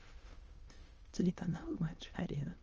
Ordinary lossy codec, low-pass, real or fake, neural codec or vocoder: Opus, 24 kbps; 7.2 kHz; fake; autoencoder, 22.05 kHz, a latent of 192 numbers a frame, VITS, trained on many speakers